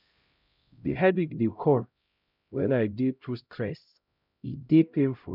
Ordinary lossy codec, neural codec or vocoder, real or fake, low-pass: none; codec, 16 kHz, 0.5 kbps, X-Codec, HuBERT features, trained on LibriSpeech; fake; 5.4 kHz